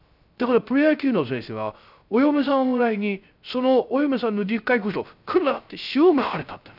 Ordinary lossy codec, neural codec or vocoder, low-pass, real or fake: none; codec, 16 kHz, 0.3 kbps, FocalCodec; 5.4 kHz; fake